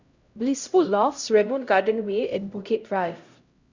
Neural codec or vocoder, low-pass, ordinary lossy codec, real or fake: codec, 16 kHz, 0.5 kbps, X-Codec, HuBERT features, trained on LibriSpeech; 7.2 kHz; Opus, 64 kbps; fake